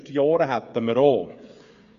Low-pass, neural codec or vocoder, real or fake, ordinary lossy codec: 7.2 kHz; codec, 16 kHz, 8 kbps, FreqCodec, smaller model; fake; Opus, 64 kbps